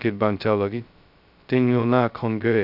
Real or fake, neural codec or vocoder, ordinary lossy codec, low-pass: fake; codec, 16 kHz, 0.2 kbps, FocalCodec; AAC, 48 kbps; 5.4 kHz